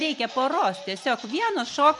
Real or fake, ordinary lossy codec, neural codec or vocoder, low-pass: fake; MP3, 64 kbps; vocoder, 44.1 kHz, 128 mel bands every 256 samples, BigVGAN v2; 10.8 kHz